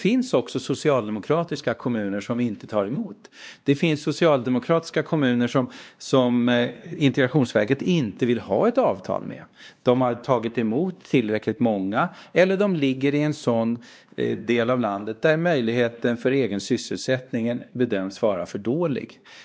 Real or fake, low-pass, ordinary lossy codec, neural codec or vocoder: fake; none; none; codec, 16 kHz, 2 kbps, X-Codec, WavLM features, trained on Multilingual LibriSpeech